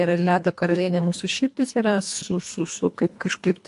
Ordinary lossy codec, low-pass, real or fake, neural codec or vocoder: AAC, 64 kbps; 10.8 kHz; fake; codec, 24 kHz, 1.5 kbps, HILCodec